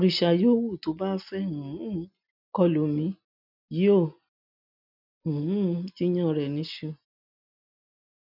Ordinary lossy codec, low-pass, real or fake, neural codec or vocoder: none; 5.4 kHz; real; none